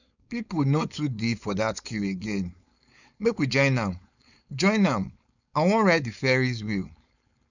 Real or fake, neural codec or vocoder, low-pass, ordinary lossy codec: fake; codec, 16 kHz, 4.8 kbps, FACodec; 7.2 kHz; none